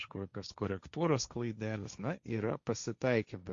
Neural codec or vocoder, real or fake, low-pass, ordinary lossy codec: codec, 16 kHz, 1.1 kbps, Voila-Tokenizer; fake; 7.2 kHz; Opus, 64 kbps